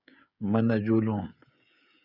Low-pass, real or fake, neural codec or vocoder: 5.4 kHz; fake; codec, 16 kHz, 16 kbps, FreqCodec, smaller model